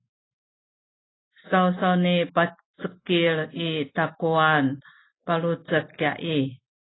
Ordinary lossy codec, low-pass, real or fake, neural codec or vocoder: AAC, 16 kbps; 7.2 kHz; real; none